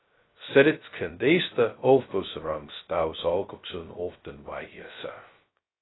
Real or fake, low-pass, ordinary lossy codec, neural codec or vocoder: fake; 7.2 kHz; AAC, 16 kbps; codec, 16 kHz, 0.2 kbps, FocalCodec